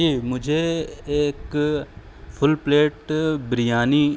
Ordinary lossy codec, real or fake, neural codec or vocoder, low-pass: none; real; none; none